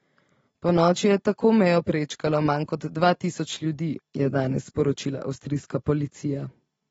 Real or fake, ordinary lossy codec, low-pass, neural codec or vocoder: real; AAC, 24 kbps; 19.8 kHz; none